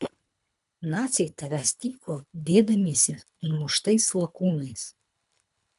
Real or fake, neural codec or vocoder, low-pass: fake; codec, 24 kHz, 3 kbps, HILCodec; 10.8 kHz